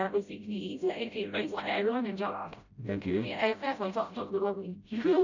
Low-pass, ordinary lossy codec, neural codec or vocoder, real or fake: 7.2 kHz; none; codec, 16 kHz, 0.5 kbps, FreqCodec, smaller model; fake